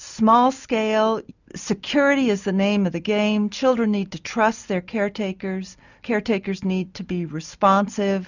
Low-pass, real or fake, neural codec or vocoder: 7.2 kHz; real; none